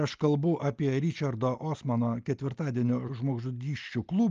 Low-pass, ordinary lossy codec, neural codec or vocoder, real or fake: 7.2 kHz; Opus, 24 kbps; none; real